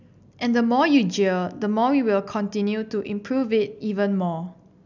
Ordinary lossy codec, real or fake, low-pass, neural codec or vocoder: none; real; 7.2 kHz; none